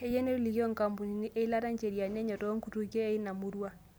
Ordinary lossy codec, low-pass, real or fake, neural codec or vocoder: none; none; real; none